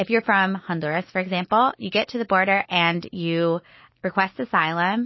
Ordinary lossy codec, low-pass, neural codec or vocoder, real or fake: MP3, 24 kbps; 7.2 kHz; none; real